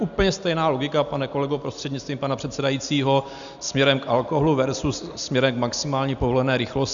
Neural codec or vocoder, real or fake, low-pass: none; real; 7.2 kHz